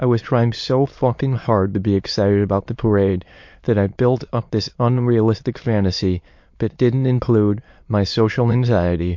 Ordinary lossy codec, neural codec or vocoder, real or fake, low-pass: MP3, 48 kbps; autoencoder, 22.05 kHz, a latent of 192 numbers a frame, VITS, trained on many speakers; fake; 7.2 kHz